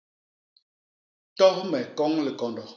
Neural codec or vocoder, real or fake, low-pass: none; real; 7.2 kHz